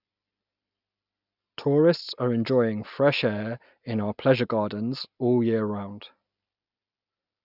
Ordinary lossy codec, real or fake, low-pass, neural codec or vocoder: none; real; 5.4 kHz; none